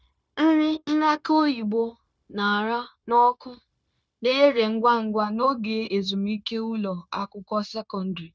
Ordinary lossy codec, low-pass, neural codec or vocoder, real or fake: none; none; codec, 16 kHz, 0.9 kbps, LongCat-Audio-Codec; fake